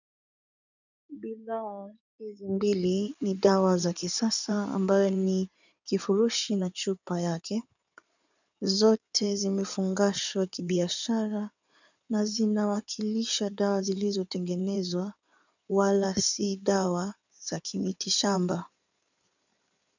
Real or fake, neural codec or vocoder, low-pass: fake; codec, 16 kHz in and 24 kHz out, 2.2 kbps, FireRedTTS-2 codec; 7.2 kHz